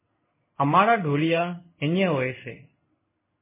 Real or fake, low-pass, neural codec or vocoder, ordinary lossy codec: fake; 3.6 kHz; codec, 16 kHz in and 24 kHz out, 1 kbps, XY-Tokenizer; MP3, 16 kbps